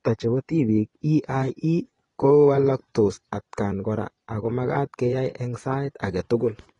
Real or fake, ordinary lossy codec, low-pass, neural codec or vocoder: fake; AAC, 32 kbps; 10.8 kHz; vocoder, 24 kHz, 100 mel bands, Vocos